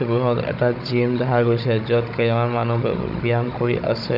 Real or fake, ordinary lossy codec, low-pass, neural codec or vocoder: fake; none; 5.4 kHz; codec, 16 kHz, 16 kbps, FunCodec, trained on Chinese and English, 50 frames a second